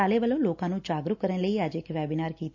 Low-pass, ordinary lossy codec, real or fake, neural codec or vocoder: 7.2 kHz; AAC, 48 kbps; real; none